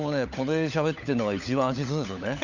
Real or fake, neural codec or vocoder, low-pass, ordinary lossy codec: fake; codec, 16 kHz, 16 kbps, FunCodec, trained on LibriTTS, 50 frames a second; 7.2 kHz; none